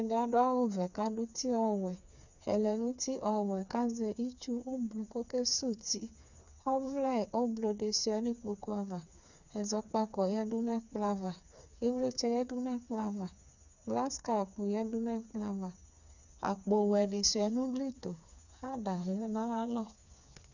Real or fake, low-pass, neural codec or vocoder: fake; 7.2 kHz; codec, 16 kHz, 4 kbps, FreqCodec, smaller model